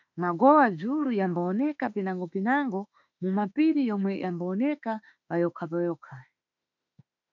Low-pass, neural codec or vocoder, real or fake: 7.2 kHz; autoencoder, 48 kHz, 32 numbers a frame, DAC-VAE, trained on Japanese speech; fake